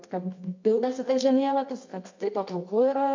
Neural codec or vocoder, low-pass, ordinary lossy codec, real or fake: codec, 24 kHz, 0.9 kbps, WavTokenizer, medium music audio release; 7.2 kHz; MP3, 48 kbps; fake